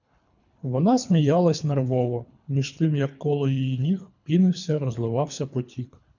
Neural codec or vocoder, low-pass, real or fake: codec, 24 kHz, 3 kbps, HILCodec; 7.2 kHz; fake